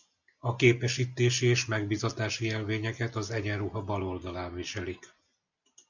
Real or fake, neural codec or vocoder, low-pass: real; none; 7.2 kHz